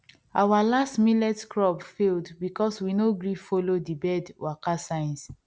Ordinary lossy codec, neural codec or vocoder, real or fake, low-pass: none; none; real; none